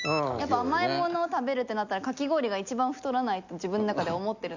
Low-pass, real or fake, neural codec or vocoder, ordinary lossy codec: 7.2 kHz; real; none; none